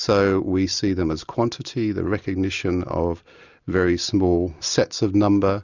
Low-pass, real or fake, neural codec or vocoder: 7.2 kHz; real; none